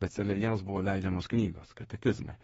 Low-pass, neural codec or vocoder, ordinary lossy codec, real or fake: 14.4 kHz; codec, 32 kHz, 1.9 kbps, SNAC; AAC, 24 kbps; fake